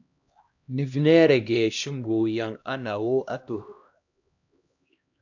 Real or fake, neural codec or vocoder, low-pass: fake; codec, 16 kHz, 1 kbps, X-Codec, HuBERT features, trained on LibriSpeech; 7.2 kHz